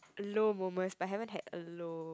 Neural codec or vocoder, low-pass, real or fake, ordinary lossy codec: none; none; real; none